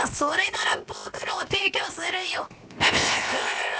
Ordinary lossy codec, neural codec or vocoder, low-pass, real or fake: none; codec, 16 kHz, 0.7 kbps, FocalCodec; none; fake